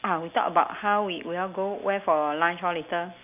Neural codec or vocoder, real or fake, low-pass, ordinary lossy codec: none; real; 3.6 kHz; none